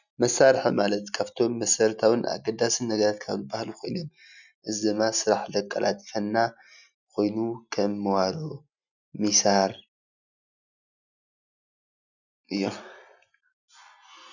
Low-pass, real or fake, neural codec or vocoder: 7.2 kHz; real; none